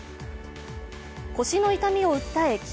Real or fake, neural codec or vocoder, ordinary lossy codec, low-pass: real; none; none; none